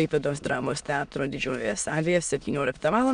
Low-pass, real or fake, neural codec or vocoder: 9.9 kHz; fake; autoencoder, 22.05 kHz, a latent of 192 numbers a frame, VITS, trained on many speakers